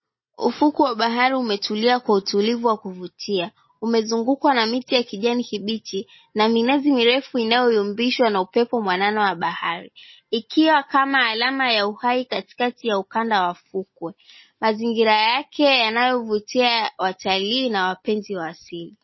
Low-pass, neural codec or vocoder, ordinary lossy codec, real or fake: 7.2 kHz; none; MP3, 24 kbps; real